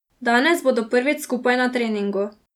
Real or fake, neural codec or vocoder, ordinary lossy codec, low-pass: real; none; none; 19.8 kHz